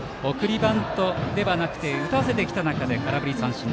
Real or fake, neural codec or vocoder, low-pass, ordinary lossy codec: real; none; none; none